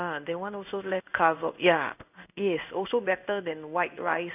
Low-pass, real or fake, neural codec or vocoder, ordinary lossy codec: 3.6 kHz; fake; codec, 16 kHz in and 24 kHz out, 1 kbps, XY-Tokenizer; none